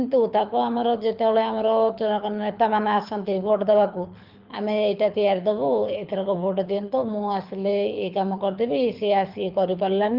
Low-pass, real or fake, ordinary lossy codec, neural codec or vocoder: 5.4 kHz; fake; Opus, 24 kbps; codec, 24 kHz, 6 kbps, HILCodec